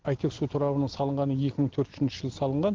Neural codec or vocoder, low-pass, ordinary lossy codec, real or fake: none; 7.2 kHz; Opus, 16 kbps; real